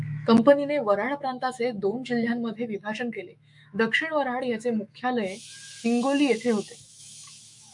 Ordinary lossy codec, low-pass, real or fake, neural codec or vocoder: MP3, 64 kbps; 10.8 kHz; fake; autoencoder, 48 kHz, 128 numbers a frame, DAC-VAE, trained on Japanese speech